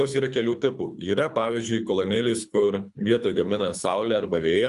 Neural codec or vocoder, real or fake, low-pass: codec, 24 kHz, 3 kbps, HILCodec; fake; 10.8 kHz